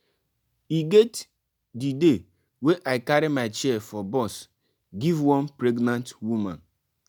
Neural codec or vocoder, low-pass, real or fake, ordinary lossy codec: none; none; real; none